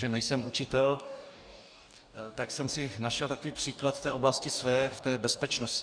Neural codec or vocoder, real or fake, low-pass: codec, 44.1 kHz, 2.6 kbps, DAC; fake; 9.9 kHz